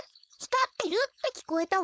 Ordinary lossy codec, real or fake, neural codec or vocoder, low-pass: none; fake; codec, 16 kHz, 4.8 kbps, FACodec; none